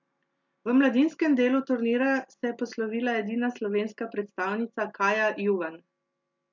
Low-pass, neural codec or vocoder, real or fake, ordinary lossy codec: 7.2 kHz; none; real; MP3, 64 kbps